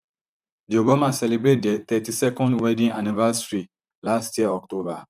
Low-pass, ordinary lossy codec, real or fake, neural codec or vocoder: 14.4 kHz; none; fake; vocoder, 44.1 kHz, 128 mel bands, Pupu-Vocoder